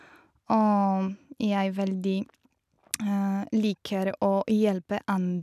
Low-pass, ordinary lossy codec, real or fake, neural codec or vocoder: 14.4 kHz; none; real; none